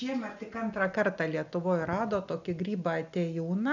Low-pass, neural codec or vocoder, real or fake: 7.2 kHz; none; real